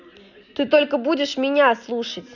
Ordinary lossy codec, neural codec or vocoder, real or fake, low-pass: none; none; real; 7.2 kHz